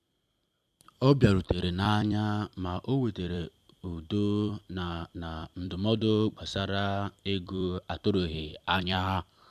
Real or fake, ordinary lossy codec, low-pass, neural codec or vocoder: fake; AAC, 96 kbps; 14.4 kHz; vocoder, 44.1 kHz, 128 mel bands every 256 samples, BigVGAN v2